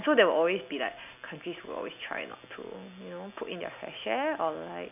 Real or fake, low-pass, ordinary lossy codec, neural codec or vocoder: real; 3.6 kHz; none; none